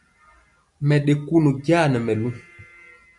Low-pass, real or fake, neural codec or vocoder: 10.8 kHz; real; none